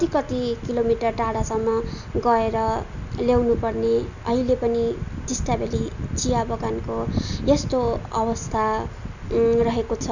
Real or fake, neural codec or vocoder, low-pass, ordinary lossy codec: real; none; 7.2 kHz; none